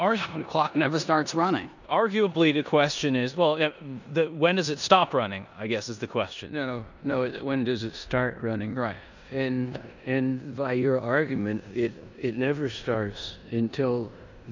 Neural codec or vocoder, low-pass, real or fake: codec, 16 kHz in and 24 kHz out, 0.9 kbps, LongCat-Audio-Codec, four codebook decoder; 7.2 kHz; fake